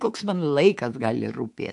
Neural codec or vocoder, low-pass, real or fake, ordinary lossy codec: codec, 24 kHz, 3.1 kbps, DualCodec; 10.8 kHz; fake; AAC, 64 kbps